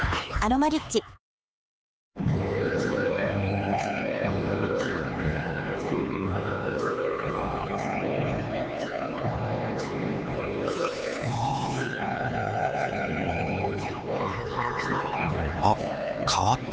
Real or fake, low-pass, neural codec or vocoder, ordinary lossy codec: fake; none; codec, 16 kHz, 4 kbps, X-Codec, HuBERT features, trained on LibriSpeech; none